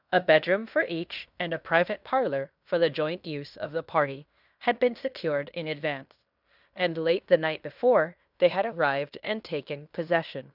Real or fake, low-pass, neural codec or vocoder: fake; 5.4 kHz; codec, 16 kHz in and 24 kHz out, 0.9 kbps, LongCat-Audio-Codec, fine tuned four codebook decoder